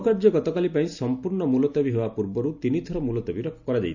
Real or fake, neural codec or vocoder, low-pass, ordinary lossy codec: real; none; 7.2 kHz; none